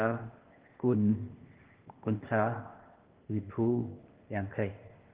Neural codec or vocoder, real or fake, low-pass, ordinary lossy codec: codec, 16 kHz, 0.8 kbps, ZipCodec; fake; 3.6 kHz; Opus, 16 kbps